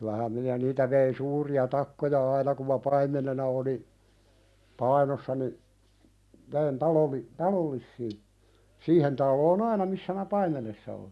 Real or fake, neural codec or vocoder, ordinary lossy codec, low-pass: real; none; none; none